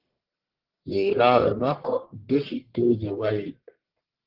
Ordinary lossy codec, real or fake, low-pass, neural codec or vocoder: Opus, 16 kbps; fake; 5.4 kHz; codec, 44.1 kHz, 1.7 kbps, Pupu-Codec